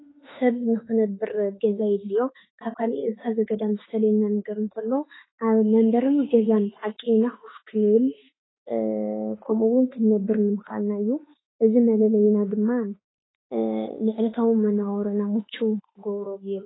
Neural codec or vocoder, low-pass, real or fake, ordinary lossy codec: autoencoder, 48 kHz, 32 numbers a frame, DAC-VAE, trained on Japanese speech; 7.2 kHz; fake; AAC, 16 kbps